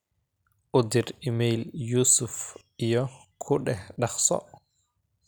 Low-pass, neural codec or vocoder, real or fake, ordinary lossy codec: none; none; real; none